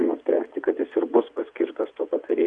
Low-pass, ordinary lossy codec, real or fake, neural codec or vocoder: 9.9 kHz; Opus, 64 kbps; fake; vocoder, 22.05 kHz, 80 mel bands, WaveNeXt